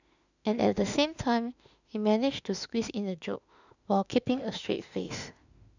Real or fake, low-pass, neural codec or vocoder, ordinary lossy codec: fake; 7.2 kHz; autoencoder, 48 kHz, 32 numbers a frame, DAC-VAE, trained on Japanese speech; none